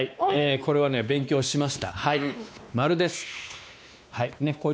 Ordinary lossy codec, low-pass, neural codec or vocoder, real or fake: none; none; codec, 16 kHz, 2 kbps, X-Codec, WavLM features, trained on Multilingual LibriSpeech; fake